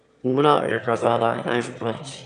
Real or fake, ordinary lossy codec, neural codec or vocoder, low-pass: fake; none; autoencoder, 22.05 kHz, a latent of 192 numbers a frame, VITS, trained on one speaker; 9.9 kHz